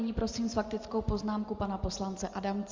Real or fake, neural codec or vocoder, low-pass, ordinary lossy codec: real; none; 7.2 kHz; Opus, 32 kbps